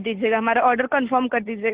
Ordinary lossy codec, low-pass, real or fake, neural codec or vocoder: Opus, 16 kbps; 3.6 kHz; real; none